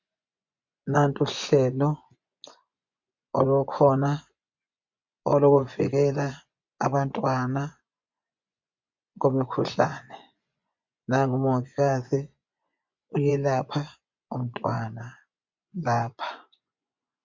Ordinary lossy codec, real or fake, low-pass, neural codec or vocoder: MP3, 64 kbps; fake; 7.2 kHz; vocoder, 24 kHz, 100 mel bands, Vocos